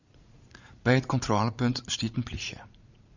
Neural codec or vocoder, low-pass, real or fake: vocoder, 44.1 kHz, 80 mel bands, Vocos; 7.2 kHz; fake